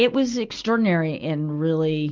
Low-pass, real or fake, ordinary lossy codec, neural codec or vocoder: 7.2 kHz; real; Opus, 32 kbps; none